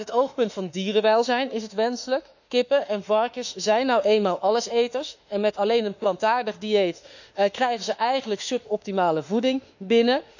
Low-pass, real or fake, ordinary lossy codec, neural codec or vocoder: 7.2 kHz; fake; none; autoencoder, 48 kHz, 32 numbers a frame, DAC-VAE, trained on Japanese speech